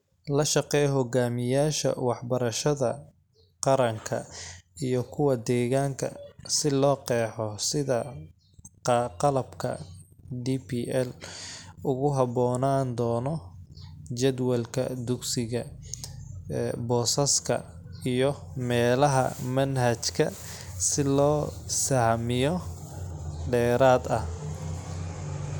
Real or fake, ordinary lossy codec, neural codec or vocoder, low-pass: real; none; none; none